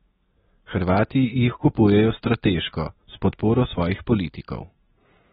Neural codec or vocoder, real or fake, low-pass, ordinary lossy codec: none; real; 19.8 kHz; AAC, 16 kbps